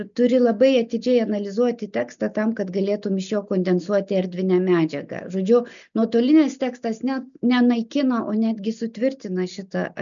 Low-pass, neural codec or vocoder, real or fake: 7.2 kHz; none; real